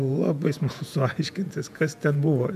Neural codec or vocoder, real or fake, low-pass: vocoder, 48 kHz, 128 mel bands, Vocos; fake; 14.4 kHz